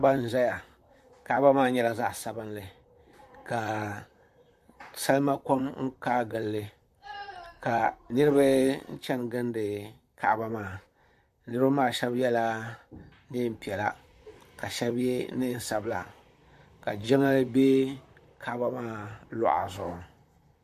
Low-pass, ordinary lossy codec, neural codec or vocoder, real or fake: 14.4 kHz; AAC, 96 kbps; vocoder, 44.1 kHz, 128 mel bands, Pupu-Vocoder; fake